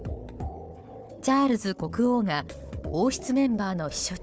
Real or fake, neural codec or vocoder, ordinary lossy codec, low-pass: fake; codec, 16 kHz, 4 kbps, FunCodec, trained on Chinese and English, 50 frames a second; none; none